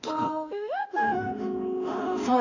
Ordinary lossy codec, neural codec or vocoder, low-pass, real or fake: none; autoencoder, 48 kHz, 32 numbers a frame, DAC-VAE, trained on Japanese speech; 7.2 kHz; fake